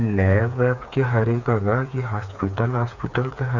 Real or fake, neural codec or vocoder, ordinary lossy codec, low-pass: fake; codec, 16 kHz, 4 kbps, FreqCodec, smaller model; Opus, 64 kbps; 7.2 kHz